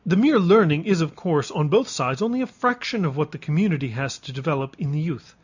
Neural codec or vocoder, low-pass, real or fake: none; 7.2 kHz; real